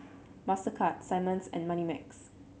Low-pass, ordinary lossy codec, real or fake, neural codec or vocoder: none; none; real; none